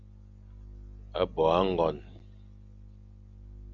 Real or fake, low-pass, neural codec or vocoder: real; 7.2 kHz; none